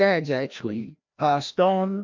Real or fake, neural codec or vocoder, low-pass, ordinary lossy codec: fake; codec, 16 kHz, 1 kbps, FreqCodec, larger model; 7.2 kHz; MP3, 64 kbps